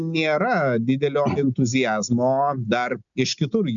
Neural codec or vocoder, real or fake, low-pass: none; real; 7.2 kHz